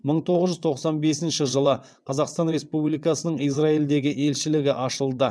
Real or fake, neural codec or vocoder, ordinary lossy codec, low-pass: fake; vocoder, 22.05 kHz, 80 mel bands, WaveNeXt; none; none